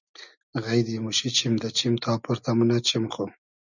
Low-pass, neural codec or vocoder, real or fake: 7.2 kHz; none; real